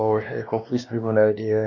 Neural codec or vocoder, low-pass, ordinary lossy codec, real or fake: codec, 16 kHz, 1 kbps, X-Codec, WavLM features, trained on Multilingual LibriSpeech; 7.2 kHz; none; fake